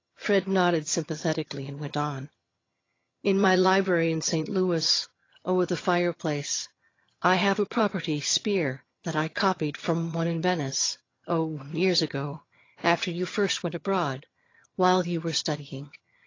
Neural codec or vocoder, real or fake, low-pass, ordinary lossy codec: vocoder, 22.05 kHz, 80 mel bands, HiFi-GAN; fake; 7.2 kHz; AAC, 32 kbps